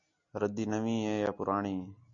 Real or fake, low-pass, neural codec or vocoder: real; 7.2 kHz; none